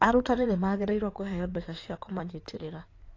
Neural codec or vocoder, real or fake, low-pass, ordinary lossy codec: codec, 16 kHz in and 24 kHz out, 2.2 kbps, FireRedTTS-2 codec; fake; 7.2 kHz; AAC, 32 kbps